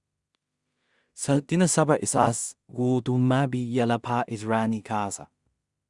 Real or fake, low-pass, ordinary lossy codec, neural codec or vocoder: fake; 10.8 kHz; Opus, 64 kbps; codec, 16 kHz in and 24 kHz out, 0.4 kbps, LongCat-Audio-Codec, two codebook decoder